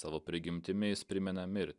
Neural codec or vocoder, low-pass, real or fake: none; 10.8 kHz; real